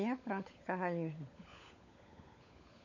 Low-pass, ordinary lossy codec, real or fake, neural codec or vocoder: 7.2 kHz; none; fake; codec, 16 kHz, 16 kbps, FunCodec, trained on LibriTTS, 50 frames a second